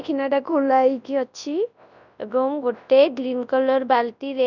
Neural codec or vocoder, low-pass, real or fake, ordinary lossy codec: codec, 24 kHz, 0.9 kbps, WavTokenizer, large speech release; 7.2 kHz; fake; none